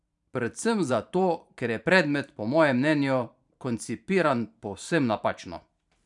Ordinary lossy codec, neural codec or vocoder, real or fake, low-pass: none; vocoder, 44.1 kHz, 128 mel bands every 512 samples, BigVGAN v2; fake; 10.8 kHz